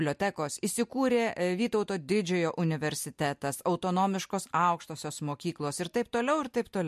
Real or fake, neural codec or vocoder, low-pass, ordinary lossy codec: real; none; 14.4 kHz; MP3, 64 kbps